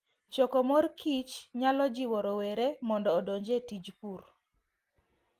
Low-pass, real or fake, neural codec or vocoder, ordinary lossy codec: 14.4 kHz; real; none; Opus, 24 kbps